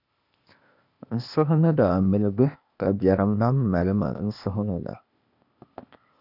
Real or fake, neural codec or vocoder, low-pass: fake; codec, 16 kHz, 0.8 kbps, ZipCodec; 5.4 kHz